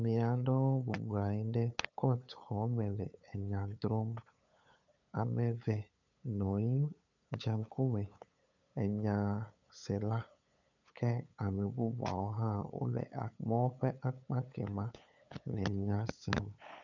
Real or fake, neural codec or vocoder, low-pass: fake; codec, 16 kHz, 8 kbps, FunCodec, trained on LibriTTS, 25 frames a second; 7.2 kHz